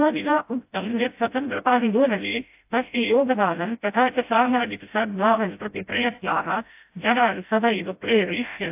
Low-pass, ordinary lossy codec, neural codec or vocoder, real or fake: 3.6 kHz; AAC, 32 kbps; codec, 16 kHz, 0.5 kbps, FreqCodec, smaller model; fake